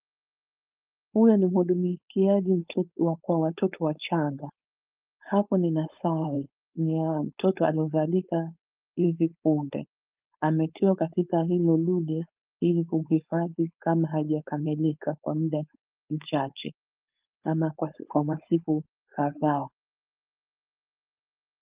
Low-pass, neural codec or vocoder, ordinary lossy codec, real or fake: 3.6 kHz; codec, 16 kHz, 4.8 kbps, FACodec; Opus, 24 kbps; fake